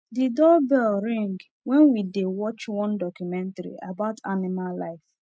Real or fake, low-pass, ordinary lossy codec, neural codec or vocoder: real; none; none; none